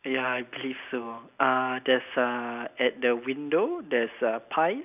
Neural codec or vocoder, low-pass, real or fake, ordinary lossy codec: none; 3.6 kHz; real; none